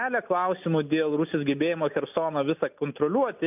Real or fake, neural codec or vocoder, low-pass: real; none; 3.6 kHz